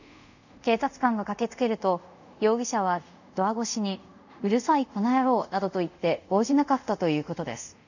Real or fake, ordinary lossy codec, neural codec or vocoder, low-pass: fake; none; codec, 24 kHz, 0.5 kbps, DualCodec; 7.2 kHz